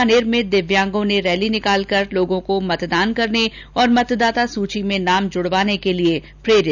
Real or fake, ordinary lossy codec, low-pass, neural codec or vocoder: real; none; 7.2 kHz; none